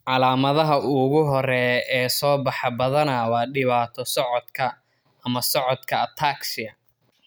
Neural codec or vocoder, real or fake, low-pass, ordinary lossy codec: none; real; none; none